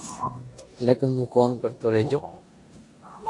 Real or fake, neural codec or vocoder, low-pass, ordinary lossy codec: fake; codec, 16 kHz in and 24 kHz out, 0.9 kbps, LongCat-Audio-Codec, four codebook decoder; 10.8 kHz; AAC, 48 kbps